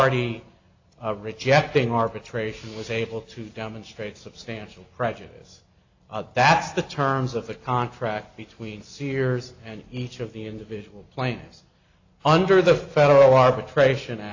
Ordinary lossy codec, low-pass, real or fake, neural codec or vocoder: AAC, 48 kbps; 7.2 kHz; real; none